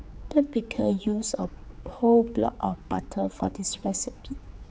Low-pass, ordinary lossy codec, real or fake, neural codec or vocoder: none; none; fake; codec, 16 kHz, 4 kbps, X-Codec, HuBERT features, trained on general audio